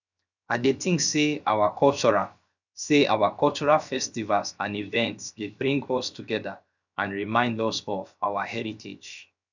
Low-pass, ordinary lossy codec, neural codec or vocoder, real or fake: 7.2 kHz; none; codec, 16 kHz, 0.7 kbps, FocalCodec; fake